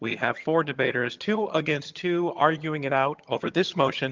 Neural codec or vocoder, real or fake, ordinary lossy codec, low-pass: vocoder, 22.05 kHz, 80 mel bands, HiFi-GAN; fake; Opus, 32 kbps; 7.2 kHz